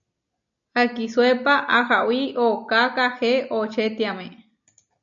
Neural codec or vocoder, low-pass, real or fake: none; 7.2 kHz; real